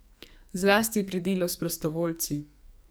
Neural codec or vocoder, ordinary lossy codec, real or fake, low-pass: codec, 44.1 kHz, 2.6 kbps, SNAC; none; fake; none